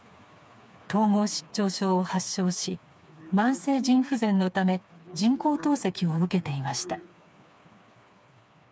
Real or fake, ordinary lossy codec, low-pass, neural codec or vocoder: fake; none; none; codec, 16 kHz, 4 kbps, FreqCodec, smaller model